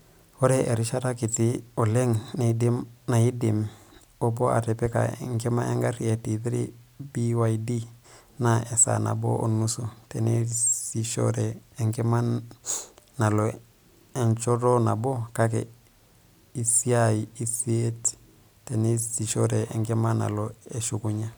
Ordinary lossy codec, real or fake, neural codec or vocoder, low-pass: none; real; none; none